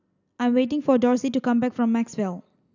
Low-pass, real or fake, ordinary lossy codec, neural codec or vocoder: 7.2 kHz; real; none; none